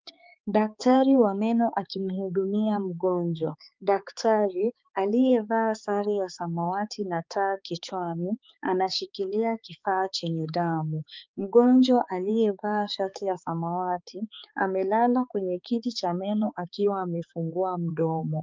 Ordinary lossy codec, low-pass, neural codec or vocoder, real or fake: Opus, 24 kbps; 7.2 kHz; codec, 16 kHz, 4 kbps, X-Codec, HuBERT features, trained on balanced general audio; fake